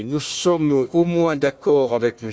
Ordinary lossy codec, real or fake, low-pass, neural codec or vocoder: none; fake; none; codec, 16 kHz, 1 kbps, FunCodec, trained on Chinese and English, 50 frames a second